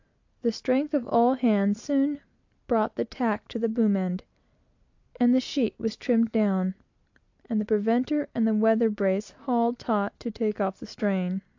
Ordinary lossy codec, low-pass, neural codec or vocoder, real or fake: AAC, 48 kbps; 7.2 kHz; none; real